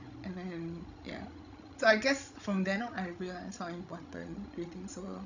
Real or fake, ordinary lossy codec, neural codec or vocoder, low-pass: fake; none; codec, 16 kHz, 16 kbps, FreqCodec, larger model; 7.2 kHz